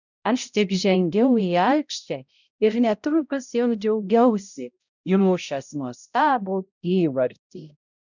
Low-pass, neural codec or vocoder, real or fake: 7.2 kHz; codec, 16 kHz, 0.5 kbps, X-Codec, HuBERT features, trained on balanced general audio; fake